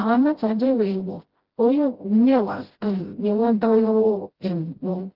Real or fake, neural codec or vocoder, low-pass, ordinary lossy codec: fake; codec, 16 kHz, 0.5 kbps, FreqCodec, smaller model; 5.4 kHz; Opus, 16 kbps